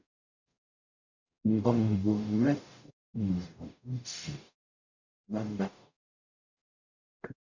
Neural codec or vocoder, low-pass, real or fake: codec, 44.1 kHz, 0.9 kbps, DAC; 7.2 kHz; fake